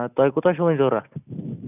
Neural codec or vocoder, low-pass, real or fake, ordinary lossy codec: none; 3.6 kHz; real; none